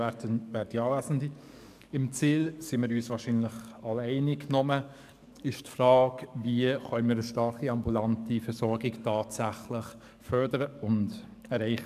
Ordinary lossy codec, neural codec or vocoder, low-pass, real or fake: none; codec, 44.1 kHz, 7.8 kbps, DAC; 14.4 kHz; fake